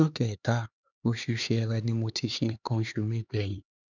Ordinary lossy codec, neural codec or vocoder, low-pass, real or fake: none; codec, 16 kHz, 4 kbps, X-Codec, HuBERT features, trained on LibriSpeech; 7.2 kHz; fake